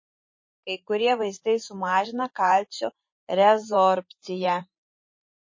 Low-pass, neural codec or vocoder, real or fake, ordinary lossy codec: 7.2 kHz; vocoder, 44.1 kHz, 128 mel bands every 512 samples, BigVGAN v2; fake; MP3, 32 kbps